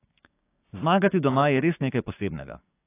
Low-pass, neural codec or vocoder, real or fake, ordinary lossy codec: 3.6 kHz; codec, 16 kHz, 6 kbps, DAC; fake; AAC, 24 kbps